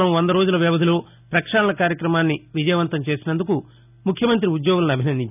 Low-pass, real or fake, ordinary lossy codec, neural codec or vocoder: 3.6 kHz; real; none; none